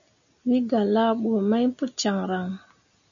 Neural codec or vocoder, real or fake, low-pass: none; real; 7.2 kHz